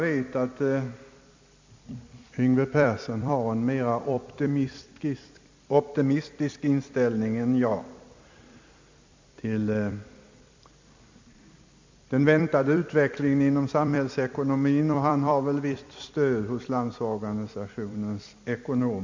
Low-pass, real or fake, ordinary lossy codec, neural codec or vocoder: 7.2 kHz; fake; MP3, 64 kbps; vocoder, 44.1 kHz, 128 mel bands every 256 samples, BigVGAN v2